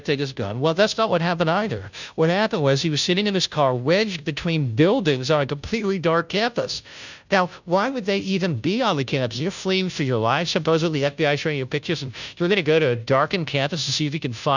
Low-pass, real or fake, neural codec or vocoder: 7.2 kHz; fake; codec, 16 kHz, 0.5 kbps, FunCodec, trained on Chinese and English, 25 frames a second